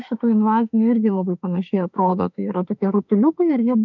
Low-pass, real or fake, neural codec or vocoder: 7.2 kHz; fake; autoencoder, 48 kHz, 32 numbers a frame, DAC-VAE, trained on Japanese speech